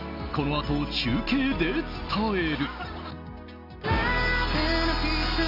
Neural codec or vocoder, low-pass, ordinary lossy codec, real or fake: none; 5.4 kHz; MP3, 48 kbps; real